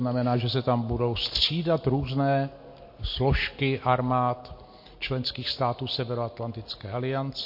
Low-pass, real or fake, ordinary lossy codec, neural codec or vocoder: 5.4 kHz; real; MP3, 32 kbps; none